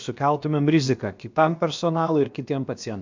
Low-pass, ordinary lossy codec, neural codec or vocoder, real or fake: 7.2 kHz; MP3, 64 kbps; codec, 16 kHz, about 1 kbps, DyCAST, with the encoder's durations; fake